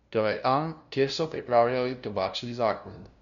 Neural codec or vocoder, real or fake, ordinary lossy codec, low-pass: codec, 16 kHz, 0.5 kbps, FunCodec, trained on LibriTTS, 25 frames a second; fake; none; 7.2 kHz